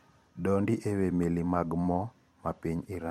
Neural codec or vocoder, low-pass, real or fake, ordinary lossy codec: vocoder, 44.1 kHz, 128 mel bands every 256 samples, BigVGAN v2; 19.8 kHz; fake; MP3, 64 kbps